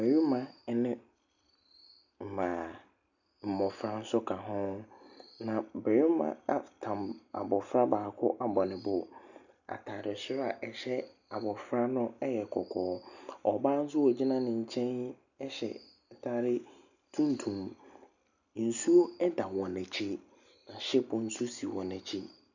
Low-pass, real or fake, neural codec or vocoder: 7.2 kHz; real; none